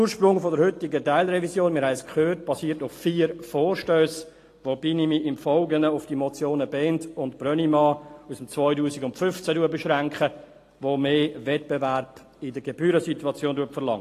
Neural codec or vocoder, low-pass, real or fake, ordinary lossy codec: none; 14.4 kHz; real; AAC, 48 kbps